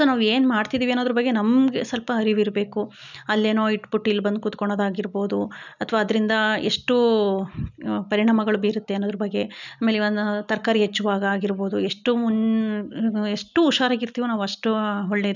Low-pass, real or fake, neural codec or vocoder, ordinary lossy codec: 7.2 kHz; real; none; none